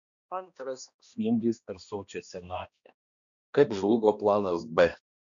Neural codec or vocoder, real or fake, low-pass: codec, 16 kHz, 1 kbps, X-Codec, HuBERT features, trained on balanced general audio; fake; 7.2 kHz